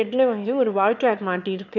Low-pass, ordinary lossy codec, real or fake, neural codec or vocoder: 7.2 kHz; none; fake; autoencoder, 22.05 kHz, a latent of 192 numbers a frame, VITS, trained on one speaker